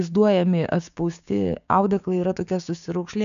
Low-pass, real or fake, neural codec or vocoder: 7.2 kHz; fake; codec, 16 kHz, 6 kbps, DAC